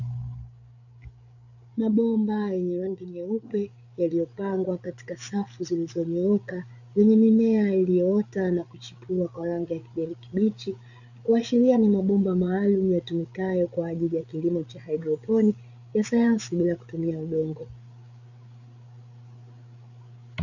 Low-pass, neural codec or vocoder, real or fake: 7.2 kHz; codec, 16 kHz, 8 kbps, FreqCodec, larger model; fake